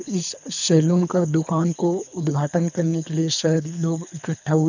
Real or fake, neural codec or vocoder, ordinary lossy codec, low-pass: fake; codec, 24 kHz, 3 kbps, HILCodec; none; 7.2 kHz